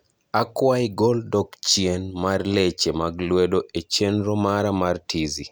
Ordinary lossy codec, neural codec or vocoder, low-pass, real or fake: none; none; none; real